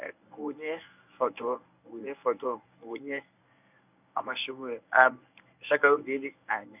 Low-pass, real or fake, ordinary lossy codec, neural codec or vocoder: 3.6 kHz; fake; none; codec, 24 kHz, 0.9 kbps, WavTokenizer, medium speech release version 1